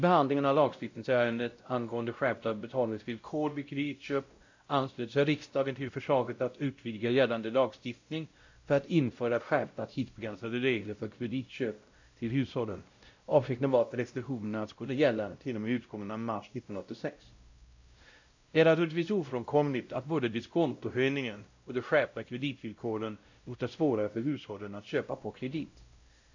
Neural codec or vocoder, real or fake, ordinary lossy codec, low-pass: codec, 16 kHz, 0.5 kbps, X-Codec, WavLM features, trained on Multilingual LibriSpeech; fake; AAC, 48 kbps; 7.2 kHz